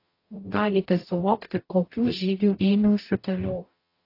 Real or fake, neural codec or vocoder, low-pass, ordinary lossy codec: fake; codec, 44.1 kHz, 0.9 kbps, DAC; 5.4 kHz; AAC, 32 kbps